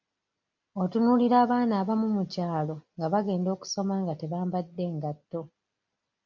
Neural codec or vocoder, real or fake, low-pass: none; real; 7.2 kHz